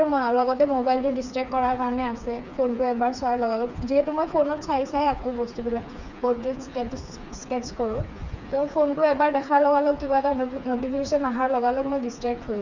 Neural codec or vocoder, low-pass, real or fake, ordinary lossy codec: codec, 16 kHz, 4 kbps, FreqCodec, smaller model; 7.2 kHz; fake; none